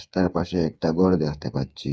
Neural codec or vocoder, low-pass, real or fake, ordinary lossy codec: codec, 16 kHz, 8 kbps, FreqCodec, smaller model; none; fake; none